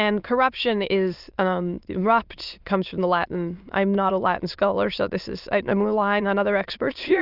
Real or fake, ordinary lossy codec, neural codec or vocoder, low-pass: fake; Opus, 32 kbps; autoencoder, 22.05 kHz, a latent of 192 numbers a frame, VITS, trained on many speakers; 5.4 kHz